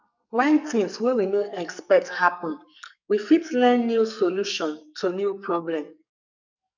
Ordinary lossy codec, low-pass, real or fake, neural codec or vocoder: none; 7.2 kHz; fake; codec, 44.1 kHz, 2.6 kbps, SNAC